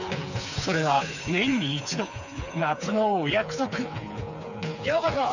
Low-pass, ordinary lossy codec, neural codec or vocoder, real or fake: 7.2 kHz; none; codec, 16 kHz, 4 kbps, FreqCodec, smaller model; fake